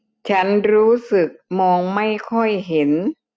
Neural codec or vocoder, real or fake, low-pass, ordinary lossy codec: none; real; none; none